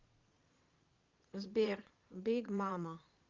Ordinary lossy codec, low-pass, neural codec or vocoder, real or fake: Opus, 32 kbps; 7.2 kHz; vocoder, 22.05 kHz, 80 mel bands, Vocos; fake